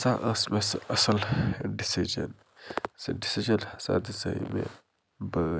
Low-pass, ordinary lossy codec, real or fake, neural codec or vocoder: none; none; real; none